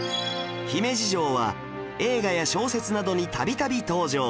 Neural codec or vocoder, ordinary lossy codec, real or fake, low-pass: none; none; real; none